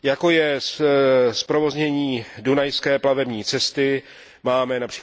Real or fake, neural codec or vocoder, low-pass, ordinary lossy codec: real; none; none; none